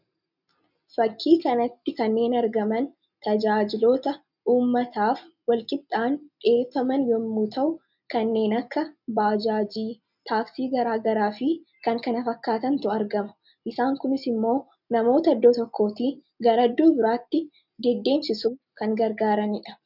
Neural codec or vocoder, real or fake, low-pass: none; real; 5.4 kHz